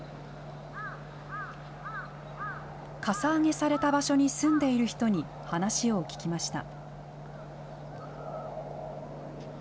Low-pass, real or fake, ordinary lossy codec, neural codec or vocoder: none; real; none; none